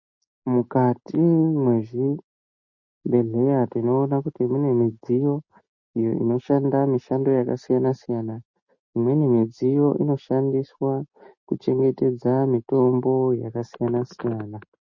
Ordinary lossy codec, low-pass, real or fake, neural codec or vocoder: MP3, 32 kbps; 7.2 kHz; real; none